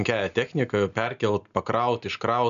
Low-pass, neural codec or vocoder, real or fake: 7.2 kHz; none; real